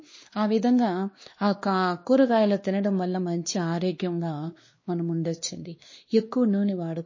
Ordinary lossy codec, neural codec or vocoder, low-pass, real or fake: MP3, 32 kbps; codec, 16 kHz, 2 kbps, X-Codec, WavLM features, trained on Multilingual LibriSpeech; 7.2 kHz; fake